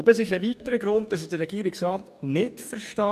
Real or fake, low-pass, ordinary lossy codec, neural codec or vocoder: fake; 14.4 kHz; none; codec, 44.1 kHz, 2.6 kbps, DAC